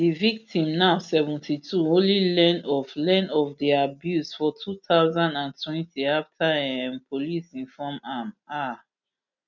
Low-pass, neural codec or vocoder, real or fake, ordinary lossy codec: 7.2 kHz; none; real; none